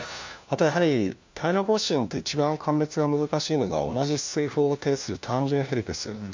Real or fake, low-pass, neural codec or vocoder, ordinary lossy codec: fake; 7.2 kHz; codec, 16 kHz, 1 kbps, FunCodec, trained on LibriTTS, 50 frames a second; MP3, 64 kbps